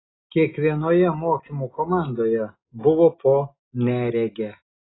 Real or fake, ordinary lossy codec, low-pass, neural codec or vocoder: real; AAC, 16 kbps; 7.2 kHz; none